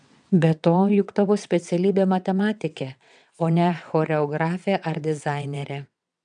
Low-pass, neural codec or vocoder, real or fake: 9.9 kHz; vocoder, 22.05 kHz, 80 mel bands, WaveNeXt; fake